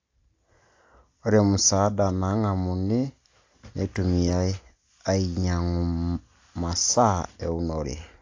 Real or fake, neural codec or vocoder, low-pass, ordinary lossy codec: real; none; 7.2 kHz; AAC, 48 kbps